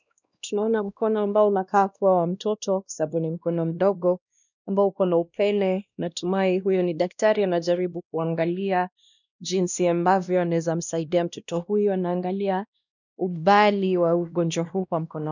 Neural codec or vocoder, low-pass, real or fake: codec, 16 kHz, 1 kbps, X-Codec, WavLM features, trained on Multilingual LibriSpeech; 7.2 kHz; fake